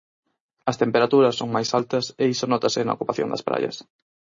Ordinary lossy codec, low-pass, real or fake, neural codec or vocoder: MP3, 32 kbps; 7.2 kHz; real; none